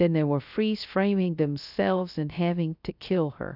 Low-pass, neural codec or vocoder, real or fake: 5.4 kHz; codec, 16 kHz, 0.3 kbps, FocalCodec; fake